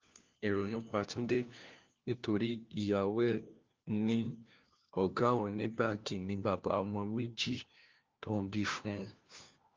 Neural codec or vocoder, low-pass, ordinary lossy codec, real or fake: codec, 16 kHz, 1 kbps, FunCodec, trained on LibriTTS, 50 frames a second; 7.2 kHz; Opus, 32 kbps; fake